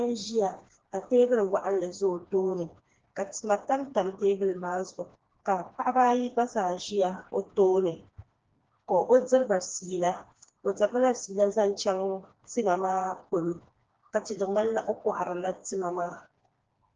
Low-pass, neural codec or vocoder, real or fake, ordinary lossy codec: 7.2 kHz; codec, 16 kHz, 2 kbps, FreqCodec, smaller model; fake; Opus, 16 kbps